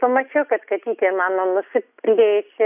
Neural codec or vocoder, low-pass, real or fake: none; 3.6 kHz; real